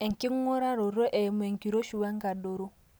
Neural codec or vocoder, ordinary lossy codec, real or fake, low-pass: none; none; real; none